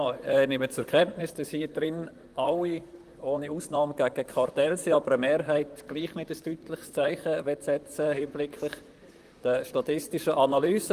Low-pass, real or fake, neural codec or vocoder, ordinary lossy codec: 14.4 kHz; fake; vocoder, 44.1 kHz, 128 mel bands, Pupu-Vocoder; Opus, 32 kbps